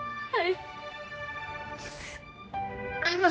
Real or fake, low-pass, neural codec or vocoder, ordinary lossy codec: fake; none; codec, 16 kHz, 1 kbps, X-Codec, HuBERT features, trained on general audio; none